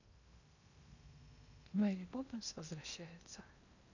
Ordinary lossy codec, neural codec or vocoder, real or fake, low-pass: none; codec, 16 kHz in and 24 kHz out, 0.8 kbps, FocalCodec, streaming, 65536 codes; fake; 7.2 kHz